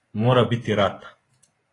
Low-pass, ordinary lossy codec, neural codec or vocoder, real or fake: 10.8 kHz; AAC, 32 kbps; vocoder, 24 kHz, 100 mel bands, Vocos; fake